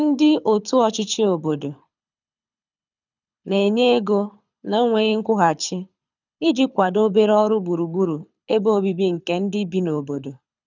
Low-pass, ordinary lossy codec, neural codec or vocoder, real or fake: 7.2 kHz; none; codec, 24 kHz, 6 kbps, HILCodec; fake